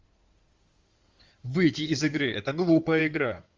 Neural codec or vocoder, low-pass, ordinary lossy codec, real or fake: codec, 16 kHz in and 24 kHz out, 2.2 kbps, FireRedTTS-2 codec; 7.2 kHz; Opus, 32 kbps; fake